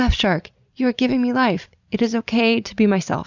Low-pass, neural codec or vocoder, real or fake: 7.2 kHz; none; real